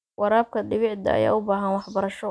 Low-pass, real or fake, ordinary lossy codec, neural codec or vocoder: none; real; none; none